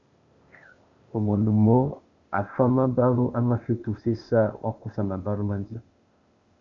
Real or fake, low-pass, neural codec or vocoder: fake; 7.2 kHz; codec, 16 kHz, 0.8 kbps, ZipCodec